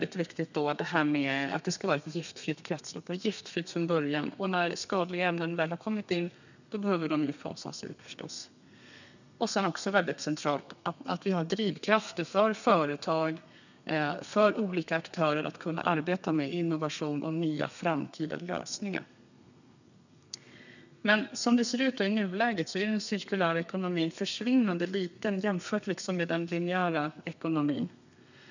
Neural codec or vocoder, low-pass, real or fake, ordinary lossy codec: codec, 32 kHz, 1.9 kbps, SNAC; 7.2 kHz; fake; none